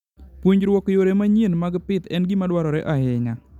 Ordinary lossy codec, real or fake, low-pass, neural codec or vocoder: none; real; 19.8 kHz; none